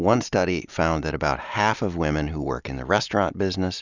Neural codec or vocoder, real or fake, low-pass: none; real; 7.2 kHz